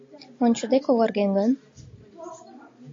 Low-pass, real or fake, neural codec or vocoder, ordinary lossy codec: 7.2 kHz; real; none; AAC, 32 kbps